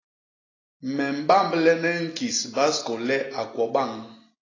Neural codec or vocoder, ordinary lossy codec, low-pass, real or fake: none; AAC, 32 kbps; 7.2 kHz; real